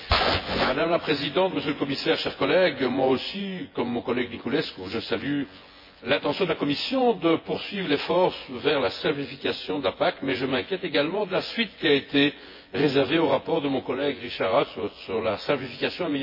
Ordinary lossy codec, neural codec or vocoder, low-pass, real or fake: MP3, 24 kbps; vocoder, 24 kHz, 100 mel bands, Vocos; 5.4 kHz; fake